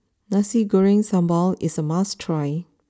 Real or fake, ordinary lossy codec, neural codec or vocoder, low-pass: real; none; none; none